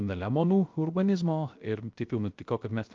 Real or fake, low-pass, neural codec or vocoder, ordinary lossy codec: fake; 7.2 kHz; codec, 16 kHz, 0.3 kbps, FocalCodec; Opus, 24 kbps